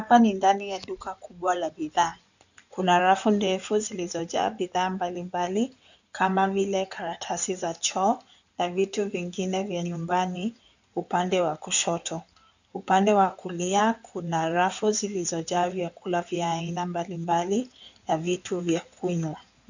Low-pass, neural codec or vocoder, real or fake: 7.2 kHz; codec, 16 kHz in and 24 kHz out, 2.2 kbps, FireRedTTS-2 codec; fake